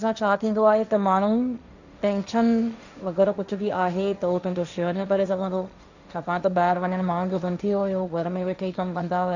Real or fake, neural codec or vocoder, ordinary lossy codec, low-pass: fake; codec, 16 kHz, 1.1 kbps, Voila-Tokenizer; none; 7.2 kHz